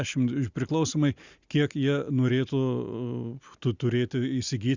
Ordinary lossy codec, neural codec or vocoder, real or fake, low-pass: Opus, 64 kbps; none; real; 7.2 kHz